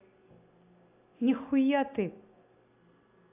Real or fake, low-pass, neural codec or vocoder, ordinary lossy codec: real; 3.6 kHz; none; AAC, 24 kbps